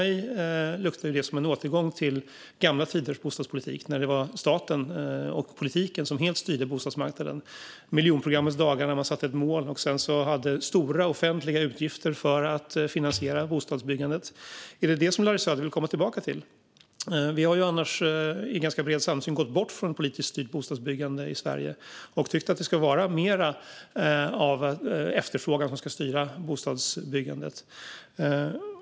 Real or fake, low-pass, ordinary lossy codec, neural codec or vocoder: real; none; none; none